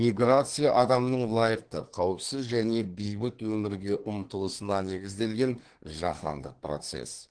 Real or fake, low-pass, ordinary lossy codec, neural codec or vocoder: fake; 9.9 kHz; Opus, 16 kbps; codec, 24 kHz, 1 kbps, SNAC